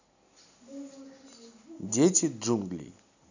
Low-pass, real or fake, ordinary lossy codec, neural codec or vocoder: 7.2 kHz; real; none; none